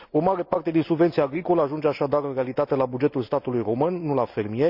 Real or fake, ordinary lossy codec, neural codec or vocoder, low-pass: real; none; none; 5.4 kHz